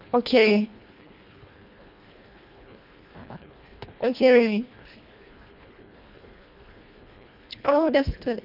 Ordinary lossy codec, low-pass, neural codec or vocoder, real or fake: none; 5.4 kHz; codec, 24 kHz, 1.5 kbps, HILCodec; fake